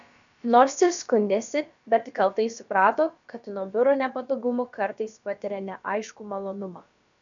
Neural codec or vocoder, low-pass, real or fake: codec, 16 kHz, about 1 kbps, DyCAST, with the encoder's durations; 7.2 kHz; fake